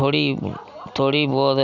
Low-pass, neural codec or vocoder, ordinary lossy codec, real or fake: 7.2 kHz; none; none; real